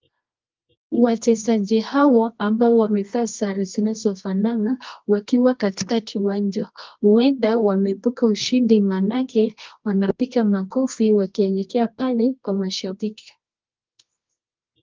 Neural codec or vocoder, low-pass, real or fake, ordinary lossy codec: codec, 24 kHz, 0.9 kbps, WavTokenizer, medium music audio release; 7.2 kHz; fake; Opus, 32 kbps